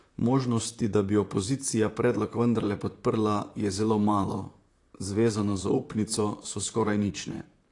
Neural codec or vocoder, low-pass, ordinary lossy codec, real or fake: vocoder, 44.1 kHz, 128 mel bands, Pupu-Vocoder; 10.8 kHz; AAC, 48 kbps; fake